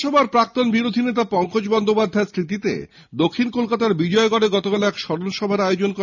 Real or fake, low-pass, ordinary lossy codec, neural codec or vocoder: real; 7.2 kHz; none; none